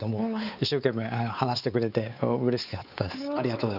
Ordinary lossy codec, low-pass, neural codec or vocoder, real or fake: none; 5.4 kHz; codec, 16 kHz, 4 kbps, X-Codec, WavLM features, trained on Multilingual LibriSpeech; fake